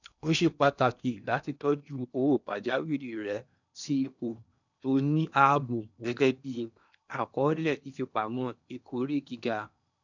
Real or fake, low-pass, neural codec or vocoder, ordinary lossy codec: fake; 7.2 kHz; codec, 16 kHz in and 24 kHz out, 0.8 kbps, FocalCodec, streaming, 65536 codes; none